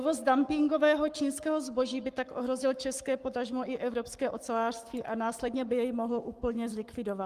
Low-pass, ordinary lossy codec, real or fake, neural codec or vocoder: 14.4 kHz; Opus, 32 kbps; fake; codec, 44.1 kHz, 7.8 kbps, Pupu-Codec